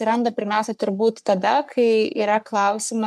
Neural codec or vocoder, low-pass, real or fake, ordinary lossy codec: codec, 44.1 kHz, 3.4 kbps, Pupu-Codec; 14.4 kHz; fake; MP3, 96 kbps